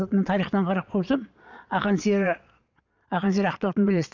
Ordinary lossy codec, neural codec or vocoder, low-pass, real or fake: none; vocoder, 22.05 kHz, 80 mel bands, Vocos; 7.2 kHz; fake